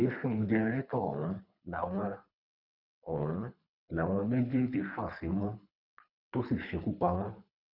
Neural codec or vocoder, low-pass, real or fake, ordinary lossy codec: codec, 24 kHz, 3 kbps, HILCodec; 5.4 kHz; fake; none